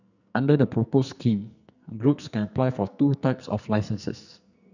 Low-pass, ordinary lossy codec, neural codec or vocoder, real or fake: 7.2 kHz; none; codec, 44.1 kHz, 2.6 kbps, SNAC; fake